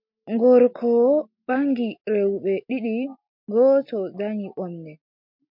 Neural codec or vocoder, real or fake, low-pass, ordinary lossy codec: none; real; 5.4 kHz; MP3, 48 kbps